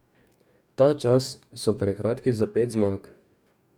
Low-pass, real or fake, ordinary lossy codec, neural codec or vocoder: 19.8 kHz; fake; none; codec, 44.1 kHz, 2.6 kbps, DAC